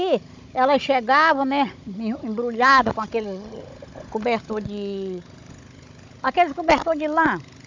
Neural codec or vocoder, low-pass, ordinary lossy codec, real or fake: codec, 16 kHz, 16 kbps, FunCodec, trained on Chinese and English, 50 frames a second; 7.2 kHz; MP3, 64 kbps; fake